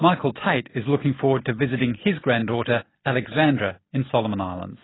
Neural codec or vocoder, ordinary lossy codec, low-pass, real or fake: none; AAC, 16 kbps; 7.2 kHz; real